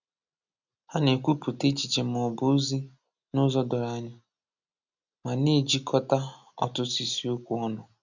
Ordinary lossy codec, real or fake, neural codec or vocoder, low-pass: none; real; none; 7.2 kHz